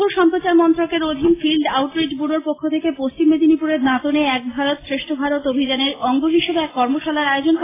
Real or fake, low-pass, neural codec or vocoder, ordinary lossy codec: real; 3.6 kHz; none; AAC, 16 kbps